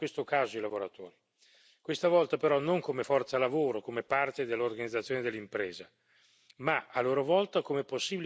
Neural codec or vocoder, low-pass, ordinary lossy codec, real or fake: none; none; none; real